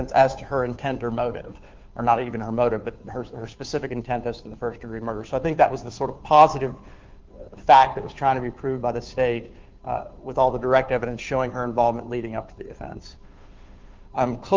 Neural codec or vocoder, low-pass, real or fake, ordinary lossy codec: codec, 16 kHz, 2 kbps, FunCodec, trained on Chinese and English, 25 frames a second; 7.2 kHz; fake; Opus, 32 kbps